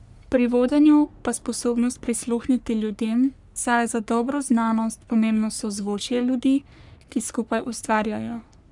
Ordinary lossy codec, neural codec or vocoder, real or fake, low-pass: none; codec, 44.1 kHz, 3.4 kbps, Pupu-Codec; fake; 10.8 kHz